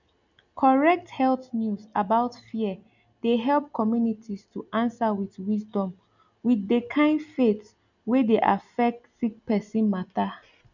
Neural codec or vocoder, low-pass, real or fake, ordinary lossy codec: none; 7.2 kHz; real; none